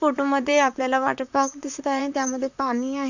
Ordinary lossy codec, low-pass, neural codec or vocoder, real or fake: none; 7.2 kHz; vocoder, 44.1 kHz, 128 mel bands, Pupu-Vocoder; fake